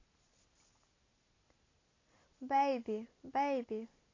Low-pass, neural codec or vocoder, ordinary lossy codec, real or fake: 7.2 kHz; none; AAC, 32 kbps; real